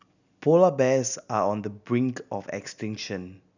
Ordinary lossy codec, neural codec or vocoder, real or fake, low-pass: none; none; real; 7.2 kHz